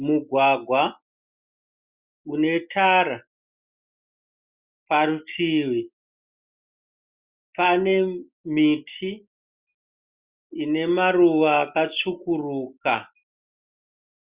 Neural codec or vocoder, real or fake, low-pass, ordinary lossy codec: none; real; 3.6 kHz; Opus, 64 kbps